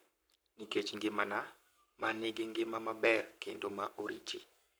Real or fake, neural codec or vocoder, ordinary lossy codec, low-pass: fake; codec, 44.1 kHz, 7.8 kbps, Pupu-Codec; none; none